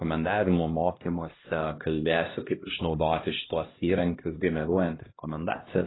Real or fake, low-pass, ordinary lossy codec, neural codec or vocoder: fake; 7.2 kHz; AAC, 16 kbps; codec, 16 kHz, 1 kbps, X-Codec, HuBERT features, trained on LibriSpeech